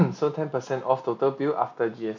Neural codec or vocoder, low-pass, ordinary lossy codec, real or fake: none; 7.2 kHz; MP3, 48 kbps; real